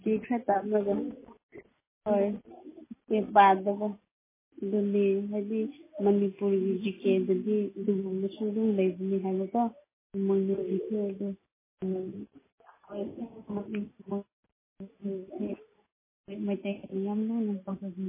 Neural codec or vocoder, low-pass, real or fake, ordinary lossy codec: none; 3.6 kHz; real; MP3, 16 kbps